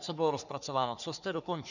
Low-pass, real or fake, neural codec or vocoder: 7.2 kHz; fake; codec, 44.1 kHz, 3.4 kbps, Pupu-Codec